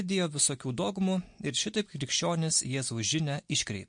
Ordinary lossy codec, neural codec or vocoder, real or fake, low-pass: MP3, 48 kbps; none; real; 9.9 kHz